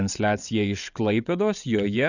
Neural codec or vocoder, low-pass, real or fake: vocoder, 22.05 kHz, 80 mel bands, WaveNeXt; 7.2 kHz; fake